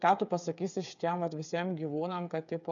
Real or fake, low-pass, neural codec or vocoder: fake; 7.2 kHz; codec, 16 kHz, 8 kbps, FreqCodec, smaller model